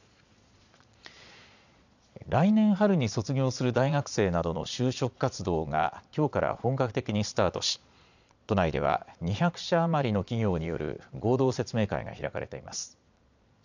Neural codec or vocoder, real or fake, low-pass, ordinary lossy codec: vocoder, 22.05 kHz, 80 mel bands, Vocos; fake; 7.2 kHz; none